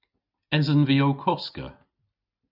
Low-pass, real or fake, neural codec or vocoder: 5.4 kHz; real; none